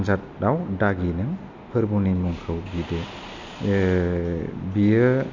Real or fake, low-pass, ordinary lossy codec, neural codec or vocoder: real; 7.2 kHz; MP3, 48 kbps; none